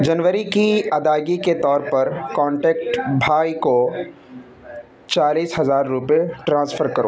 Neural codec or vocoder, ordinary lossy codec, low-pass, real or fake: none; none; none; real